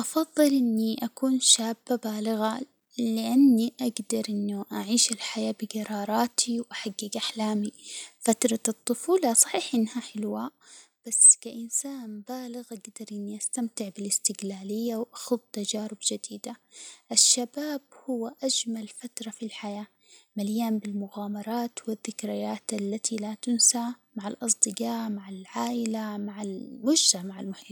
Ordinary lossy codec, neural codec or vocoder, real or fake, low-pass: none; none; real; none